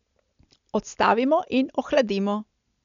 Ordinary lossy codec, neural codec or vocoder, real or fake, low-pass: MP3, 96 kbps; none; real; 7.2 kHz